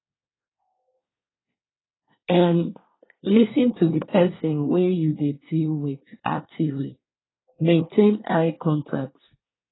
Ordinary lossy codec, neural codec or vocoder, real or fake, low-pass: AAC, 16 kbps; codec, 24 kHz, 1 kbps, SNAC; fake; 7.2 kHz